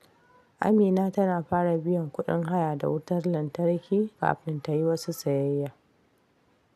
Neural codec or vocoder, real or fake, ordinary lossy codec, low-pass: none; real; none; 14.4 kHz